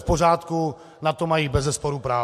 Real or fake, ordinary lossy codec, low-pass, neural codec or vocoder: real; MP3, 64 kbps; 14.4 kHz; none